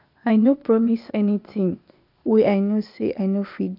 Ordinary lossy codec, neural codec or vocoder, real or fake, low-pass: none; codec, 16 kHz, 0.8 kbps, ZipCodec; fake; 5.4 kHz